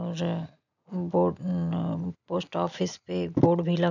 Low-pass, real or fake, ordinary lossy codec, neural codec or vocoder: 7.2 kHz; real; none; none